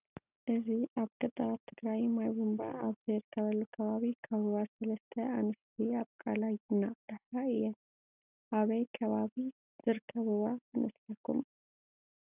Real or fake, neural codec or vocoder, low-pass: real; none; 3.6 kHz